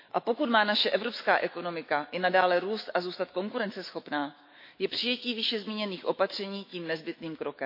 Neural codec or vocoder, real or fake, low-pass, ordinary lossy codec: autoencoder, 48 kHz, 128 numbers a frame, DAC-VAE, trained on Japanese speech; fake; 5.4 kHz; MP3, 32 kbps